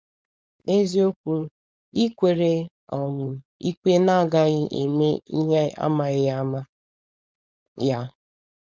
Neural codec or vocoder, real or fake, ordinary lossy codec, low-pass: codec, 16 kHz, 4.8 kbps, FACodec; fake; none; none